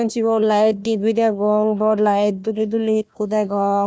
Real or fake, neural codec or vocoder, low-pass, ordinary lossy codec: fake; codec, 16 kHz, 1 kbps, FunCodec, trained on Chinese and English, 50 frames a second; none; none